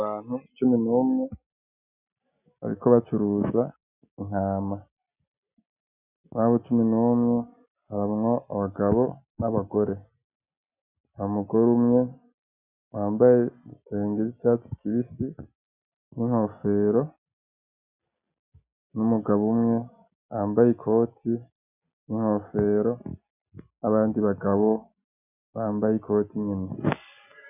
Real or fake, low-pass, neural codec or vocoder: real; 3.6 kHz; none